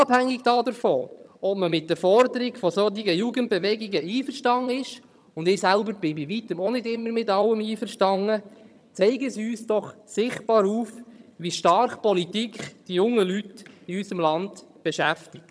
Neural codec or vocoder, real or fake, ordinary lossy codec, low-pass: vocoder, 22.05 kHz, 80 mel bands, HiFi-GAN; fake; none; none